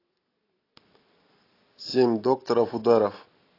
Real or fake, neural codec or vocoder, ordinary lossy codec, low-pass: real; none; AAC, 24 kbps; 5.4 kHz